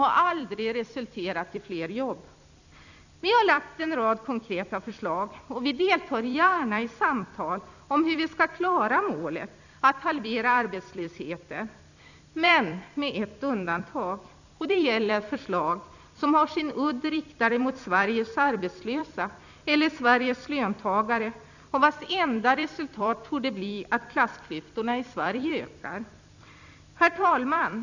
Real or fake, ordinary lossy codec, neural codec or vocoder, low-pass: fake; none; vocoder, 44.1 kHz, 128 mel bands every 256 samples, BigVGAN v2; 7.2 kHz